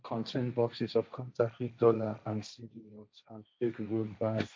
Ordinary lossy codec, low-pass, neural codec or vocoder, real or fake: none; none; codec, 16 kHz, 1.1 kbps, Voila-Tokenizer; fake